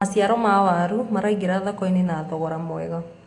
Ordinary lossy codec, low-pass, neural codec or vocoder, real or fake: AAC, 48 kbps; 10.8 kHz; none; real